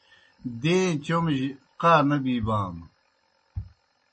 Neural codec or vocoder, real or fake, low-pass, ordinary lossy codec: none; real; 10.8 kHz; MP3, 32 kbps